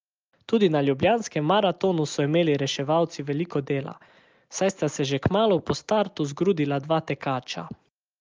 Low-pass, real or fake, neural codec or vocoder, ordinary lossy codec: 7.2 kHz; real; none; Opus, 24 kbps